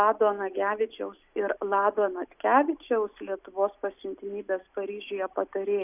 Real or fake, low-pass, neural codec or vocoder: real; 3.6 kHz; none